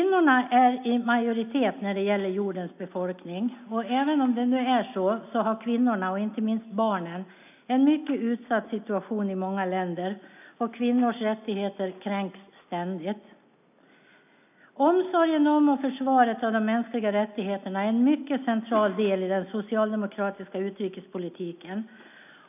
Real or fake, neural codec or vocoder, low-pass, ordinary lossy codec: real; none; 3.6 kHz; none